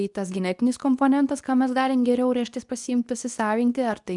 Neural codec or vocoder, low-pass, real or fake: codec, 24 kHz, 0.9 kbps, WavTokenizer, medium speech release version 2; 10.8 kHz; fake